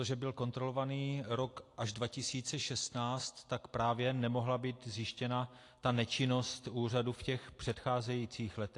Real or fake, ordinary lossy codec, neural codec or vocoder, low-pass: real; AAC, 48 kbps; none; 10.8 kHz